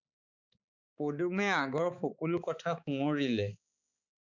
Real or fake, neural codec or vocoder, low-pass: fake; codec, 16 kHz, 4 kbps, X-Codec, HuBERT features, trained on balanced general audio; 7.2 kHz